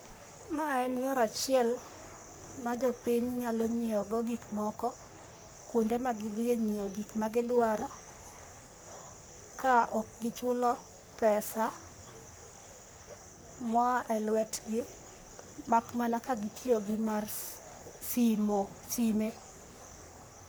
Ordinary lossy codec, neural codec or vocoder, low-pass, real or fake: none; codec, 44.1 kHz, 3.4 kbps, Pupu-Codec; none; fake